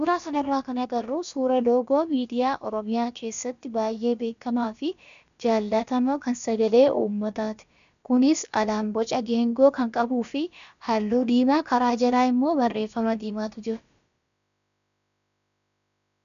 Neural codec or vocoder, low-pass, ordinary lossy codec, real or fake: codec, 16 kHz, about 1 kbps, DyCAST, with the encoder's durations; 7.2 kHz; MP3, 96 kbps; fake